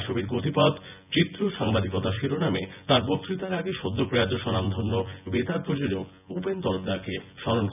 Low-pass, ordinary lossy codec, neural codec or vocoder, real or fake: 3.6 kHz; none; vocoder, 24 kHz, 100 mel bands, Vocos; fake